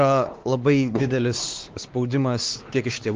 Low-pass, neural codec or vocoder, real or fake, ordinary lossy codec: 7.2 kHz; codec, 16 kHz, 4 kbps, X-Codec, WavLM features, trained on Multilingual LibriSpeech; fake; Opus, 16 kbps